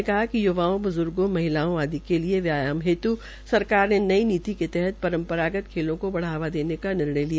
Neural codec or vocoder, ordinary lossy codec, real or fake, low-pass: none; none; real; none